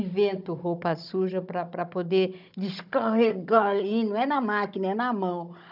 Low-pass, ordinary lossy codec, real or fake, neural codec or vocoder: 5.4 kHz; none; fake; codec, 16 kHz, 16 kbps, FunCodec, trained on Chinese and English, 50 frames a second